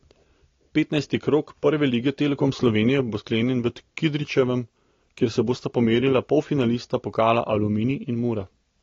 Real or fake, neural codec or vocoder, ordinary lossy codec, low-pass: real; none; AAC, 32 kbps; 7.2 kHz